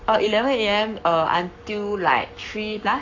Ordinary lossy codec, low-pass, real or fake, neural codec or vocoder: AAC, 48 kbps; 7.2 kHz; fake; codec, 44.1 kHz, 7.8 kbps, Pupu-Codec